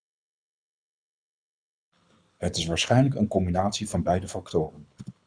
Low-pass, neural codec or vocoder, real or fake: 9.9 kHz; codec, 24 kHz, 6 kbps, HILCodec; fake